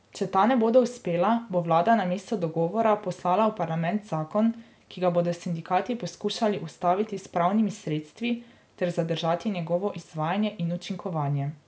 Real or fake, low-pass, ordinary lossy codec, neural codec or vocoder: real; none; none; none